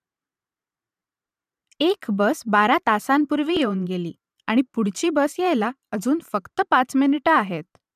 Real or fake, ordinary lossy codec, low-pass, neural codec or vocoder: fake; none; 14.4 kHz; vocoder, 44.1 kHz, 128 mel bands every 512 samples, BigVGAN v2